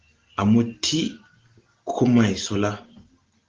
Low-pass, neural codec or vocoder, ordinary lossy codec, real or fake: 7.2 kHz; none; Opus, 16 kbps; real